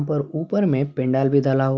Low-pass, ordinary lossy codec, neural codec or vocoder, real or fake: none; none; none; real